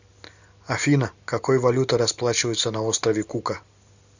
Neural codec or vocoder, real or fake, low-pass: none; real; 7.2 kHz